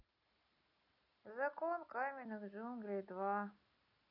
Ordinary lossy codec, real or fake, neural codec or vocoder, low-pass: none; real; none; 5.4 kHz